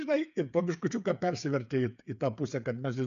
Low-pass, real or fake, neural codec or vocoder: 7.2 kHz; fake; codec, 16 kHz, 8 kbps, FreqCodec, smaller model